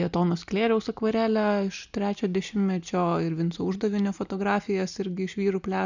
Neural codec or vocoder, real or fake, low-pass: none; real; 7.2 kHz